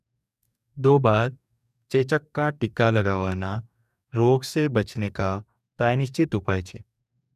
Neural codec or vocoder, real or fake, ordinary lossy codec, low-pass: codec, 44.1 kHz, 2.6 kbps, DAC; fake; none; 14.4 kHz